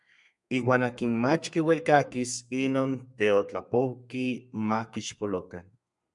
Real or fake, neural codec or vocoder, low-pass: fake; codec, 32 kHz, 1.9 kbps, SNAC; 10.8 kHz